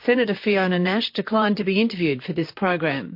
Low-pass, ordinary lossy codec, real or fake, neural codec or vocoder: 5.4 kHz; MP3, 32 kbps; fake; vocoder, 44.1 kHz, 128 mel bands, Pupu-Vocoder